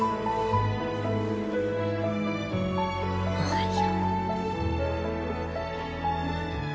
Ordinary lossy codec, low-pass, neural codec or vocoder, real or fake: none; none; none; real